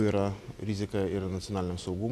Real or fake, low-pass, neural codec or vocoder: real; 14.4 kHz; none